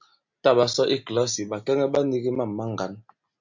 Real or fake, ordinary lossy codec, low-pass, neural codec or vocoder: real; MP3, 64 kbps; 7.2 kHz; none